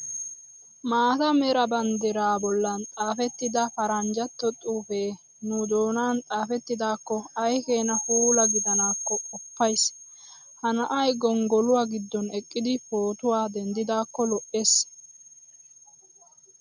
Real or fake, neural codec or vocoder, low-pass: real; none; 7.2 kHz